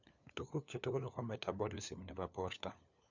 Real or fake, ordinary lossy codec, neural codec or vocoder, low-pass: fake; none; codec, 16 kHz, 4 kbps, FunCodec, trained on LibriTTS, 50 frames a second; 7.2 kHz